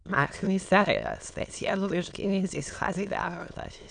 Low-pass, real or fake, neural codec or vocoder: 9.9 kHz; fake; autoencoder, 22.05 kHz, a latent of 192 numbers a frame, VITS, trained on many speakers